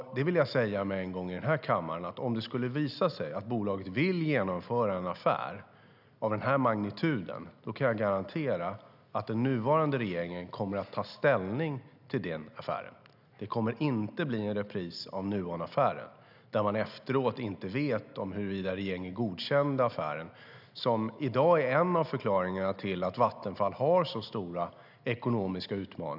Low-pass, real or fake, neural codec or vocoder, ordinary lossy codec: 5.4 kHz; real; none; none